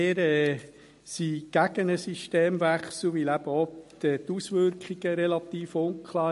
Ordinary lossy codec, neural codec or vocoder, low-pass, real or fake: MP3, 48 kbps; none; 14.4 kHz; real